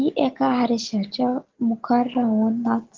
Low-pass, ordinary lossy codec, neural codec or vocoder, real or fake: 7.2 kHz; Opus, 16 kbps; none; real